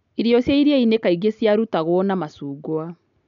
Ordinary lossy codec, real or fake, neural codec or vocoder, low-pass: none; real; none; 7.2 kHz